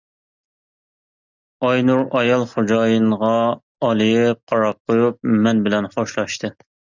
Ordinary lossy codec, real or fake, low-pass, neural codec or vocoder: Opus, 64 kbps; real; 7.2 kHz; none